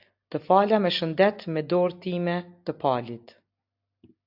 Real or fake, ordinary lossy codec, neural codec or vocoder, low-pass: real; AAC, 48 kbps; none; 5.4 kHz